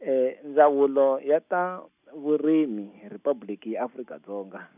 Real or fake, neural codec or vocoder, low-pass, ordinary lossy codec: real; none; 3.6 kHz; none